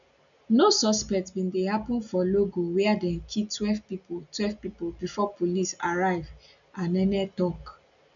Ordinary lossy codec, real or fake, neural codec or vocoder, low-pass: none; real; none; 7.2 kHz